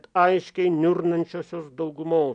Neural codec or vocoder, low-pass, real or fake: none; 9.9 kHz; real